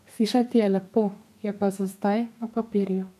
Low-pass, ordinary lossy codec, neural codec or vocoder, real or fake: 14.4 kHz; none; codec, 32 kHz, 1.9 kbps, SNAC; fake